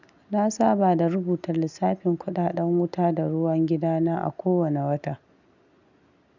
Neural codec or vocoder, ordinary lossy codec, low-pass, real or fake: none; none; 7.2 kHz; real